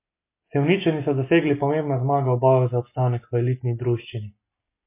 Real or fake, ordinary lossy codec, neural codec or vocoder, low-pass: real; MP3, 24 kbps; none; 3.6 kHz